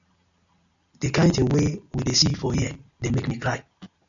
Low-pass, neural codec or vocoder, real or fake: 7.2 kHz; none; real